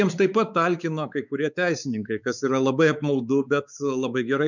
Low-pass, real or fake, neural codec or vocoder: 7.2 kHz; fake; codec, 16 kHz, 4 kbps, X-Codec, WavLM features, trained on Multilingual LibriSpeech